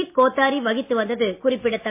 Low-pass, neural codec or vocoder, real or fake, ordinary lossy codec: 3.6 kHz; none; real; MP3, 24 kbps